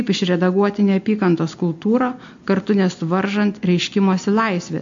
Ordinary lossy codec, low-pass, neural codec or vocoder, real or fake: MP3, 48 kbps; 7.2 kHz; none; real